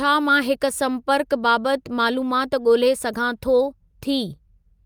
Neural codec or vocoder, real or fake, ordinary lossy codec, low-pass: none; real; Opus, 32 kbps; 19.8 kHz